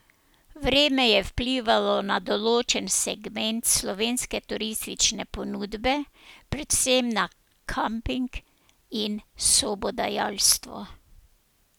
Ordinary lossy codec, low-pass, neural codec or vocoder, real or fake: none; none; none; real